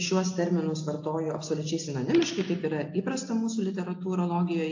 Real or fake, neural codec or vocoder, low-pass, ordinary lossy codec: real; none; 7.2 kHz; AAC, 48 kbps